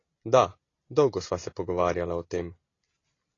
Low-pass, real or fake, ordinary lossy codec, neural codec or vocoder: 7.2 kHz; real; AAC, 32 kbps; none